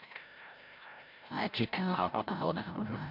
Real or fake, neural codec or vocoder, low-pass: fake; codec, 16 kHz, 0.5 kbps, FreqCodec, larger model; 5.4 kHz